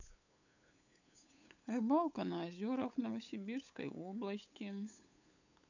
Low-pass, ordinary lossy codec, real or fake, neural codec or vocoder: 7.2 kHz; none; fake; codec, 16 kHz, 8 kbps, FunCodec, trained on LibriTTS, 25 frames a second